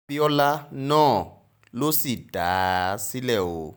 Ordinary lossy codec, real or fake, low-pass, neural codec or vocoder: none; real; none; none